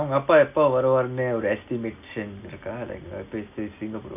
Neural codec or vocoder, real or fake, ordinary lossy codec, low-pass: none; real; none; 3.6 kHz